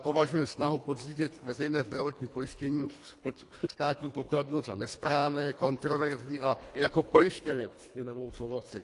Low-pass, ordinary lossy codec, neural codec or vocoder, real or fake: 10.8 kHz; AAC, 48 kbps; codec, 24 kHz, 1.5 kbps, HILCodec; fake